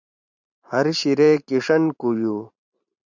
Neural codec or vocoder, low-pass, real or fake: vocoder, 44.1 kHz, 128 mel bands every 512 samples, BigVGAN v2; 7.2 kHz; fake